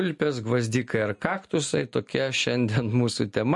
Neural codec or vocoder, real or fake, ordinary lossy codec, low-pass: none; real; MP3, 48 kbps; 10.8 kHz